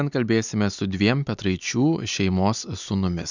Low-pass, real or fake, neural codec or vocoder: 7.2 kHz; real; none